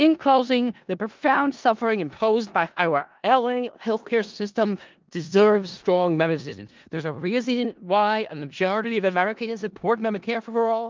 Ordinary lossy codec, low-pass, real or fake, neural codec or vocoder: Opus, 24 kbps; 7.2 kHz; fake; codec, 16 kHz in and 24 kHz out, 0.4 kbps, LongCat-Audio-Codec, four codebook decoder